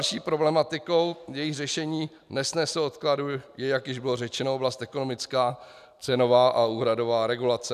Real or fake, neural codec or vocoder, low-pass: real; none; 14.4 kHz